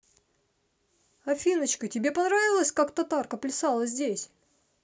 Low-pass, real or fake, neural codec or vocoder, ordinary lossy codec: none; real; none; none